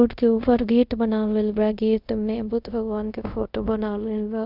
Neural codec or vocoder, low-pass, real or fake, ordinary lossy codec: codec, 24 kHz, 0.5 kbps, DualCodec; 5.4 kHz; fake; none